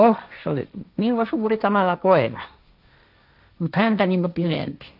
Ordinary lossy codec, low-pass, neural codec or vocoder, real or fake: none; 5.4 kHz; codec, 16 kHz, 1.1 kbps, Voila-Tokenizer; fake